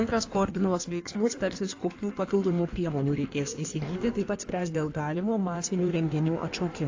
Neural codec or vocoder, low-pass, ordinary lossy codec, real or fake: codec, 16 kHz in and 24 kHz out, 1.1 kbps, FireRedTTS-2 codec; 7.2 kHz; AAC, 48 kbps; fake